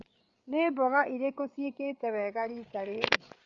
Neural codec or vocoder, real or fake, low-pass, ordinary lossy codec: codec, 16 kHz, 8 kbps, FreqCodec, larger model; fake; 7.2 kHz; Opus, 64 kbps